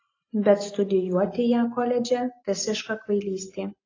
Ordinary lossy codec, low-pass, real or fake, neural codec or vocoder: AAC, 32 kbps; 7.2 kHz; real; none